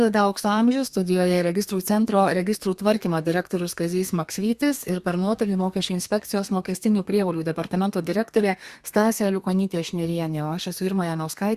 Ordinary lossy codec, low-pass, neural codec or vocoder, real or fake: Opus, 64 kbps; 14.4 kHz; codec, 32 kHz, 1.9 kbps, SNAC; fake